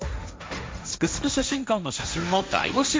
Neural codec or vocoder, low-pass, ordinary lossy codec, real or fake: codec, 16 kHz, 1.1 kbps, Voila-Tokenizer; none; none; fake